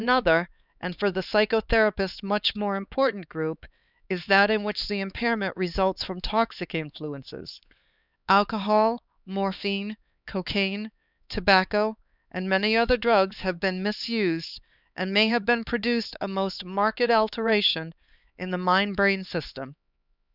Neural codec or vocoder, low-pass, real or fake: codec, 16 kHz, 4 kbps, X-Codec, HuBERT features, trained on LibriSpeech; 5.4 kHz; fake